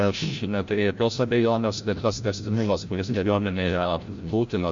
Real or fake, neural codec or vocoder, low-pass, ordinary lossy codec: fake; codec, 16 kHz, 0.5 kbps, FreqCodec, larger model; 7.2 kHz; MP3, 48 kbps